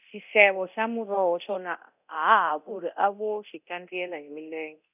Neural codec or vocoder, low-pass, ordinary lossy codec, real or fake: codec, 24 kHz, 0.9 kbps, DualCodec; 3.6 kHz; none; fake